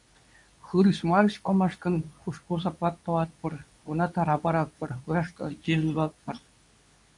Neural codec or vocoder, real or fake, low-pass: codec, 24 kHz, 0.9 kbps, WavTokenizer, medium speech release version 2; fake; 10.8 kHz